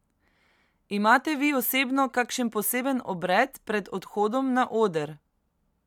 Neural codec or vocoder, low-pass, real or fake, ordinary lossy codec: none; 19.8 kHz; real; MP3, 96 kbps